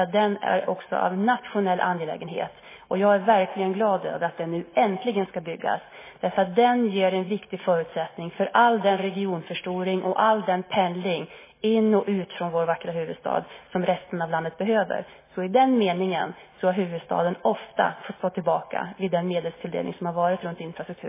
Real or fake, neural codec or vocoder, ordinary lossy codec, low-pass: real; none; MP3, 16 kbps; 3.6 kHz